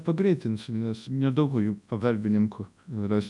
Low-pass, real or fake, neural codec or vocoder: 10.8 kHz; fake; codec, 24 kHz, 0.9 kbps, WavTokenizer, large speech release